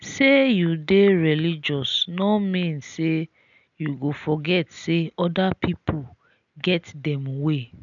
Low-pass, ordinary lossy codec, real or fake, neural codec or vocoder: 7.2 kHz; none; real; none